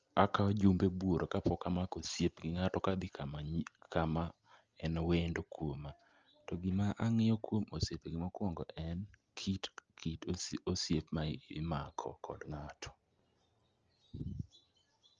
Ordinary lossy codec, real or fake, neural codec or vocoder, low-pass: Opus, 24 kbps; real; none; 7.2 kHz